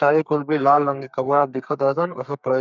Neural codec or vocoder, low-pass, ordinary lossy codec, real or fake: codec, 32 kHz, 1.9 kbps, SNAC; 7.2 kHz; none; fake